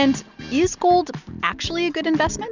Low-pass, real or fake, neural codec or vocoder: 7.2 kHz; real; none